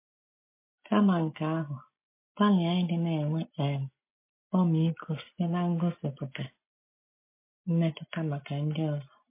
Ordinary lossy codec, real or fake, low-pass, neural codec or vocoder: MP3, 24 kbps; real; 3.6 kHz; none